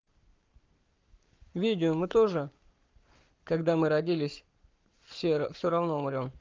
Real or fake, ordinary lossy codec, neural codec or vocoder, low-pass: fake; Opus, 32 kbps; codec, 44.1 kHz, 7.8 kbps, Pupu-Codec; 7.2 kHz